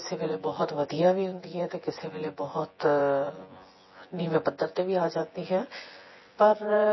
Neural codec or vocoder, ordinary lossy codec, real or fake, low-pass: vocoder, 24 kHz, 100 mel bands, Vocos; MP3, 24 kbps; fake; 7.2 kHz